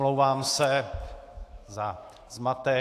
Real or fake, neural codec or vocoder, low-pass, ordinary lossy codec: fake; autoencoder, 48 kHz, 128 numbers a frame, DAC-VAE, trained on Japanese speech; 14.4 kHz; AAC, 64 kbps